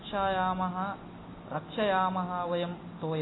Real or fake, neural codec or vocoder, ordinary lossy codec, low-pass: real; none; AAC, 16 kbps; 7.2 kHz